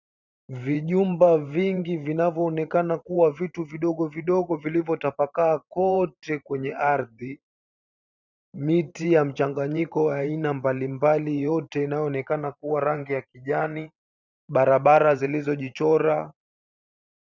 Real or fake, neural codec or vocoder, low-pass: fake; vocoder, 44.1 kHz, 128 mel bands every 512 samples, BigVGAN v2; 7.2 kHz